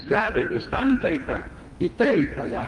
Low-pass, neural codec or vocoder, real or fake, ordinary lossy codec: 10.8 kHz; codec, 24 kHz, 1.5 kbps, HILCodec; fake; Opus, 32 kbps